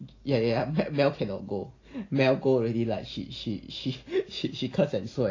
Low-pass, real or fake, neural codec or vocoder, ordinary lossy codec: 7.2 kHz; real; none; AAC, 32 kbps